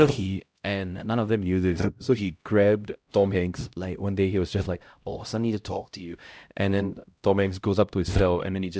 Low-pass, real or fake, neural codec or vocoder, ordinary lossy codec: none; fake; codec, 16 kHz, 0.5 kbps, X-Codec, HuBERT features, trained on LibriSpeech; none